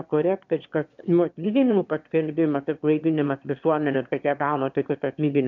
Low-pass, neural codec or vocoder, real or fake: 7.2 kHz; autoencoder, 22.05 kHz, a latent of 192 numbers a frame, VITS, trained on one speaker; fake